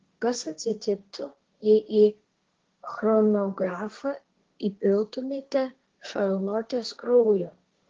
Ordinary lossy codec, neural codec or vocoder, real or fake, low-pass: Opus, 16 kbps; codec, 16 kHz, 1.1 kbps, Voila-Tokenizer; fake; 7.2 kHz